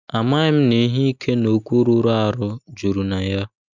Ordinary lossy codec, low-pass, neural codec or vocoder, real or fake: none; 7.2 kHz; none; real